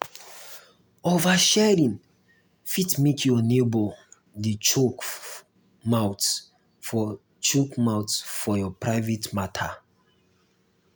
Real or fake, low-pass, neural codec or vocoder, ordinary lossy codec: real; none; none; none